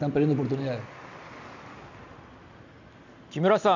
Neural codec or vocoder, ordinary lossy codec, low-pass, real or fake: none; none; 7.2 kHz; real